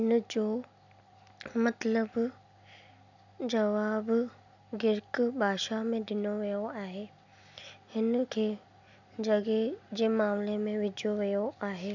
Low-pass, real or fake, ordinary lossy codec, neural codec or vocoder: 7.2 kHz; real; none; none